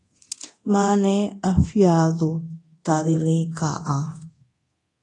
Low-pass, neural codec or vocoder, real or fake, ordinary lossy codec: 10.8 kHz; codec, 24 kHz, 0.9 kbps, DualCodec; fake; AAC, 32 kbps